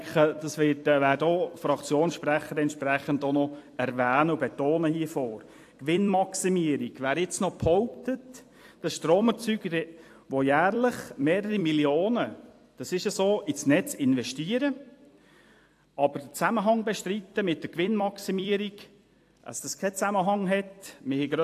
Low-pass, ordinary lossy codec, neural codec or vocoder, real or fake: 14.4 kHz; AAC, 64 kbps; vocoder, 44.1 kHz, 128 mel bands every 256 samples, BigVGAN v2; fake